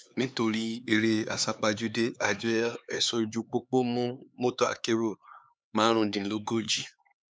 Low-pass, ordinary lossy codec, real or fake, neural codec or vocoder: none; none; fake; codec, 16 kHz, 4 kbps, X-Codec, HuBERT features, trained on LibriSpeech